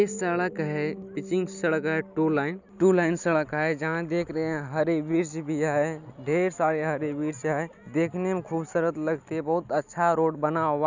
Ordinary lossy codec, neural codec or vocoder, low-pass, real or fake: none; none; 7.2 kHz; real